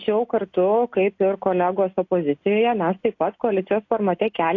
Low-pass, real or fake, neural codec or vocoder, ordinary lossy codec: 7.2 kHz; real; none; AAC, 48 kbps